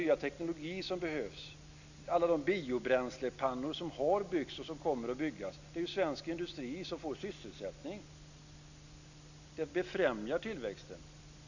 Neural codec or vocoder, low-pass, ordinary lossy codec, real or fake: none; 7.2 kHz; none; real